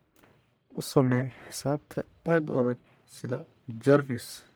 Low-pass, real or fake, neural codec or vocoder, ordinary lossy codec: none; fake; codec, 44.1 kHz, 1.7 kbps, Pupu-Codec; none